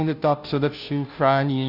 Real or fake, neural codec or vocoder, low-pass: fake; codec, 16 kHz, 0.5 kbps, FunCodec, trained on Chinese and English, 25 frames a second; 5.4 kHz